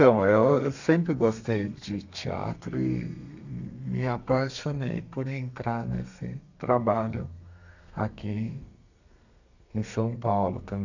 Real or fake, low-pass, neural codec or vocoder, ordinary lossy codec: fake; 7.2 kHz; codec, 32 kHz, 1.9 kbps, SNAC; none